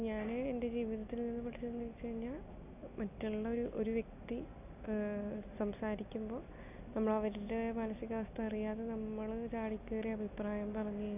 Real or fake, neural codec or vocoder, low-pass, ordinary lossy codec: real; none; 3.6 kHz; none